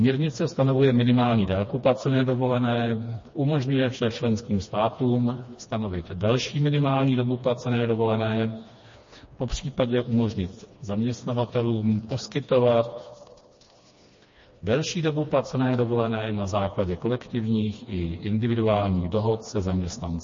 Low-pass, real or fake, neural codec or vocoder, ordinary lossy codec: 7.2 kHz; fake; codec, 16 kHz, 2 kbps, FreqCodec, smaller model; MP3, 32 kbps